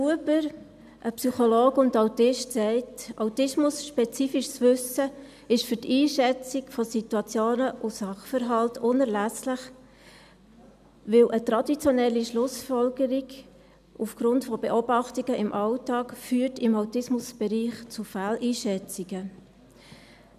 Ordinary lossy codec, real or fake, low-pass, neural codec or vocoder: none; real; 14.4 kHz; none